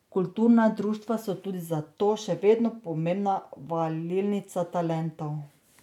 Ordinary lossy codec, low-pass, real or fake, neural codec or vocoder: none; 19.8 kHz; real; none